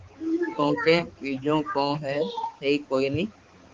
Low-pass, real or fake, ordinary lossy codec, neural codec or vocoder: 7.2 kHz; fake; Opus, 24 kbps; codec, 16 kHz, 4 kbps, X-Codec, HuBERT features, trained on balanced general audio